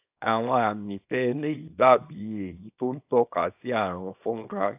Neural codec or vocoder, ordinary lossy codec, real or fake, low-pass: codec, 24 kHz, 0.9 kbps, WavTokenizer, small release; AAC, 32 kbps; fake; 3.6 kHz